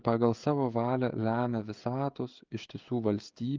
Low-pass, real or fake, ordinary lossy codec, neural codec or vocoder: 7.2 kHz; fake; Opus, 32 kbps; codec, 16 kHz, 16 kbps, FreqCodec, smaller model